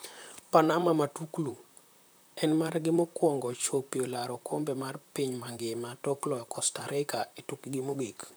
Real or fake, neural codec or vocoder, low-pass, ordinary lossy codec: fake; vocoder, 44.1 kHz, 128 mel bands, Pupu-Vocoder; none; none